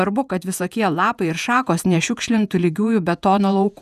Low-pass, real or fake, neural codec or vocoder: 14.4 kHz; fake; vocoder, 48 kHz, 128 mel bands, Vocos